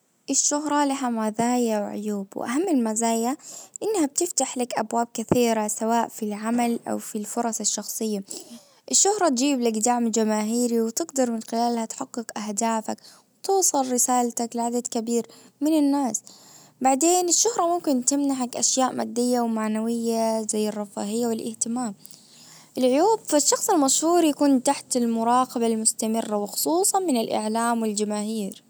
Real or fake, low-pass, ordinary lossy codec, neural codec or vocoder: real; none; none; none